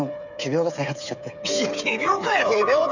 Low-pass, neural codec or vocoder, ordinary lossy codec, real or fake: 7.2 kHz; codec, 16 kHz, 8 kbps, FreqCodec, smaller model; none; fake